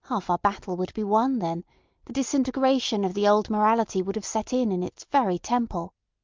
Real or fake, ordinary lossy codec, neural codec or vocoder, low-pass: real; Opus, 24 kbps; none; 7.2 kHz